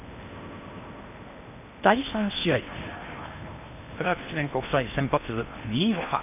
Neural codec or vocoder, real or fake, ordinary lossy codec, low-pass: codec, 16 kHz in and 24 kHz out, 0.8 kbps, FocalCodec, streaming, 65536 codes; fake; none; 3.6 kHz